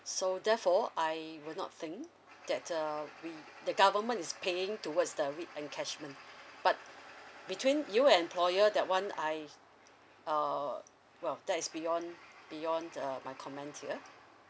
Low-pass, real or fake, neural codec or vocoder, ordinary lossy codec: none; real; none; none